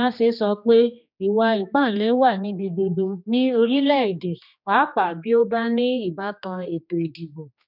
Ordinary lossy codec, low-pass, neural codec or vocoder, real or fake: none; 5.4 kHz; codec, 16 kHz, 2 kbps, X-Codec, HuBERT features, trained on general audio; fake